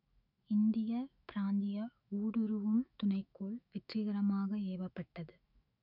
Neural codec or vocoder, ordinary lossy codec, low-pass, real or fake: autoencoder, 48 kHz, 128 numbers a frame, DAC-VAE, trained on Japanese speech; none; 5.4 kHz; fake